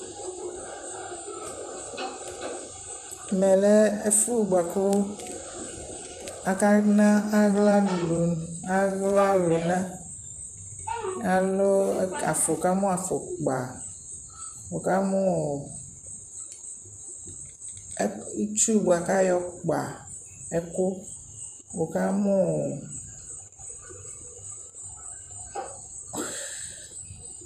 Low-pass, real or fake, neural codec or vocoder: 14.4 kHz; fake; vocoder, 44.1 kHz, 128 mel bands, Pupu-Vocoder